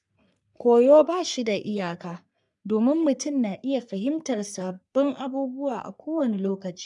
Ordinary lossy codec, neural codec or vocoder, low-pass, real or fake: none; codec, 44.1 kHz, 3.4 kbps, Pupu-Codec; 10.8 kHz; fake